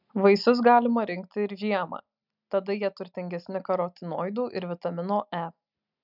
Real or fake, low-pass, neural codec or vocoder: real; 5.4 kHz; none